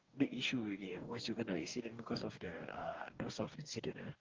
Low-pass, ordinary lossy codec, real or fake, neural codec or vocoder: 7.2 kHz; Opus, 32 kbps; fake; codec, 44.1 kHz, 2.6 kbps, DAC